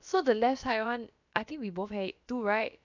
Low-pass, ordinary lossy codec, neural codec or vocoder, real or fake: 7.2 kHz; none; codec, 16 kHz, about 1 kbps, DyCAST, with the encoder's durations; fake